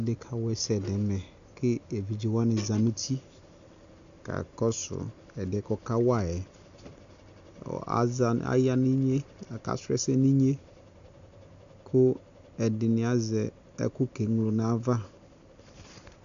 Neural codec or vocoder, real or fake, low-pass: none; real; 7.2 kHz